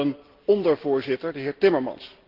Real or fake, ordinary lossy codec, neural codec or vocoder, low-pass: real; Opus, 16 kbps; none; 5.4 kHz